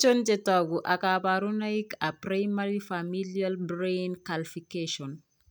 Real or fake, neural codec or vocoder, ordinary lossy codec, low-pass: real; none; none; none